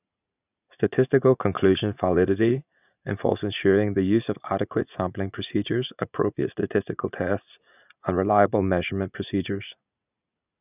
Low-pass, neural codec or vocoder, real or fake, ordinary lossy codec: 3.6 kHz; vocoder, 44.1 kHz, 80 mel bands, Vocos; fake; none